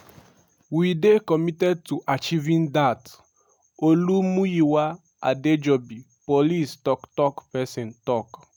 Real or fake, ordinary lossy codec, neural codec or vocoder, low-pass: real; none; none; 19.8 kHz